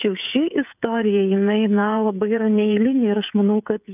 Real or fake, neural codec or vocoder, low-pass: fake; codec, 16 kHz, 16 kbps, FreqCodec, smaller model; 3.6 kHz